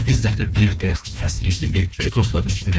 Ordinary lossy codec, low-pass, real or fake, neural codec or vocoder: none; none; fake; codec, 16 kHz, 1 kbps, FunCodec, trained on Chinese and English, 50 frames a second